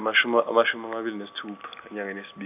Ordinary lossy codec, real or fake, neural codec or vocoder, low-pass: none; real; none; 3.6 kHz